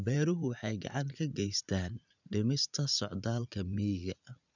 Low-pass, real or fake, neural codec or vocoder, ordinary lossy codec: 7.2 kHz; fake; vocoder, 44.1 kHz, 80 mel bands, Vocos; none